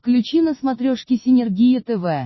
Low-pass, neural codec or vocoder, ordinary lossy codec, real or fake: 7.2 kHz; none; MP3, 24 kbps; real